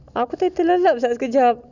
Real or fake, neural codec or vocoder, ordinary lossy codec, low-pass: real; none; none; 7.2 kHz